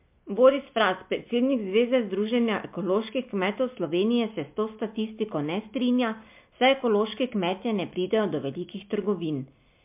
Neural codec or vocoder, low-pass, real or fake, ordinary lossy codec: none; 3.6 kHz; real; MP3, 32 kbps